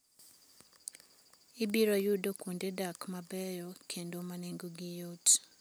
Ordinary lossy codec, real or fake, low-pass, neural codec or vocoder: none; real; none; none